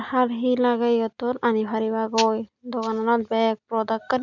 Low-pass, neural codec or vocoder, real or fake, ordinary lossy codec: 7.2 kHz; none; real; none